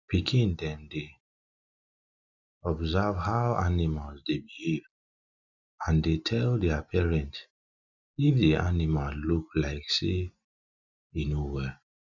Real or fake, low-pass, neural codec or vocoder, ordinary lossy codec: real; 7.2 kHz; none; none